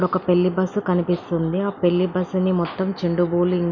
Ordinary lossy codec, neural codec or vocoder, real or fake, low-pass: none; none; real; 7.2 kHz